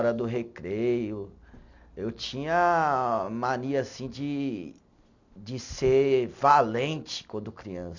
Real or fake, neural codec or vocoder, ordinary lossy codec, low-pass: real; none; none; 7.2 kHz